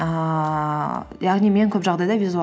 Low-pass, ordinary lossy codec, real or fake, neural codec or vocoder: none; none; real; none